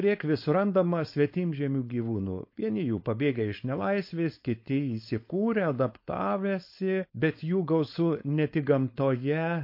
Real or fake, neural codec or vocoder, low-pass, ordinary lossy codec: fake; codec, 16 kHz, 4.8 kbps, FACodec; 5.4 kHz; MP3, 32 kbps